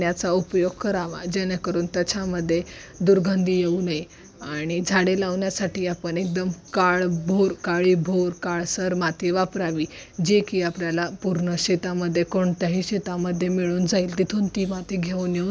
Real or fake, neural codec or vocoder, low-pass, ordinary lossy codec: real; none; none; none